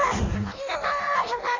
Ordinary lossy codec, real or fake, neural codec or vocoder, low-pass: AAC, 32 kbps; fake; codec, 16 kHz in and 24 kHz out, 0.6 kbps, FireRedTTS-2 codec; 7.2 kHz